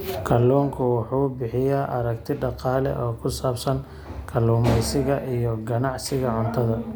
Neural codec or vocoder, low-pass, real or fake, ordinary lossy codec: none; none; real; none